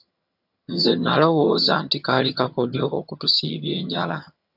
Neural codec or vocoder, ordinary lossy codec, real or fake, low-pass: vocoder, 22.05 kHz, 80 mel bands, HiFi-GAN; AAC, 48 kbps; fake; 5.4 kHz